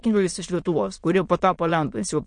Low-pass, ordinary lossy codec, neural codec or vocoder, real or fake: 9.9 kHz; MP3, 48 kbps; autoencoder, 22.05 kHz, a latent of 192 numbers a frame, VITS, trained on many speakers; fake